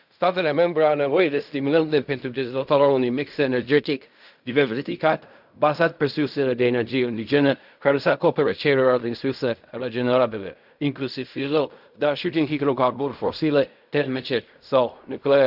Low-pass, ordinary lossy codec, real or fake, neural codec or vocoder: 5.4 kHz; none; fake; codec, 16 kHz in and 24 kHz out, 0.4 kbps, LongCat-Audio-Codec, fine tuned four codebook decoder